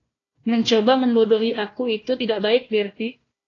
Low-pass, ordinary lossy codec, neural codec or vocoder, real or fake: 7.2 kHz; AAC, 32 kbps; codec, 16 kHz, 1 kbps, FunCodec, trained on Chinese and English, 50 frames a second; fake